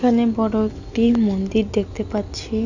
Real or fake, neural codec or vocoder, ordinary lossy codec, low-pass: real; none; none; 7.2 kHz